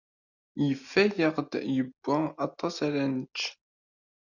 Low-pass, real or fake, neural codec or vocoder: 7.2 kHz; real; none